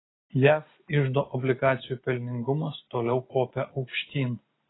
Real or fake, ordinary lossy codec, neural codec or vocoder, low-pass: real; AAC, 16 kbps; none; 7.2 kHz